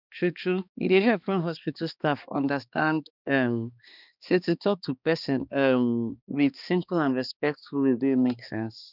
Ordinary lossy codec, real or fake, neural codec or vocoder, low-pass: none; fake; codec, 16 kHz, 2 kbps, X-Codec, HuBERT features, trained on balanced general audio; 5.4 kHz